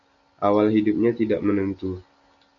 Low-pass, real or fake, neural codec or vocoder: 7.2 kHz; real; none